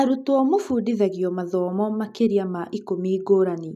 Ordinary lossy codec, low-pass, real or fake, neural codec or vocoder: none; 14.4 kHz; real; none